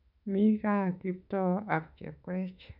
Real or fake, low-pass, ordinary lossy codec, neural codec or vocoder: fake; 5.4 kHz; none; autoencoder, 48 kHz, 32 numbers a frame, DAC-VAE, trained on Japanese speech